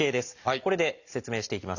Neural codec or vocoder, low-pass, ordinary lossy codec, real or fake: none; 7.2 kHz; none; real